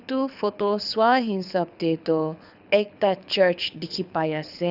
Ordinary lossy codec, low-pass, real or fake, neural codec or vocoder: none; 5.4 kHz; fake; codec, 24 kHz, 6 kbps, HILCodec